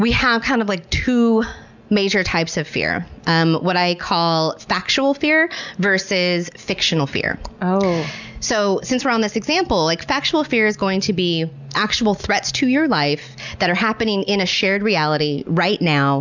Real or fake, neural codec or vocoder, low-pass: real; none; 7.2 kHz